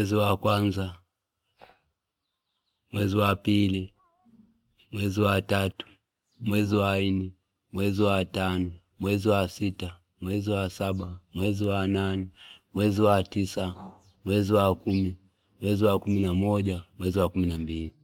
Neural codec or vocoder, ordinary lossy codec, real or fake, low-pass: none; MP3, 96 kbps; real; 19.8 kHz